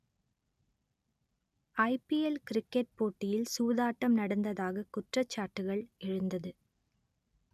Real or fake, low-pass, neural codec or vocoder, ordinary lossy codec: real; 14.4 kHz; none; none